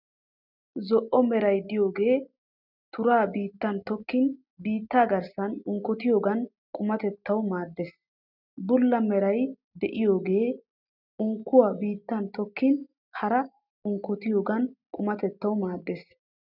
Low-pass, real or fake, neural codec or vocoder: 5.4 kHz; real; none